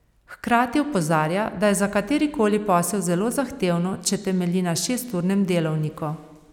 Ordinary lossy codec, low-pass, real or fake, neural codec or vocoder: none; 19.8 kHz; real; none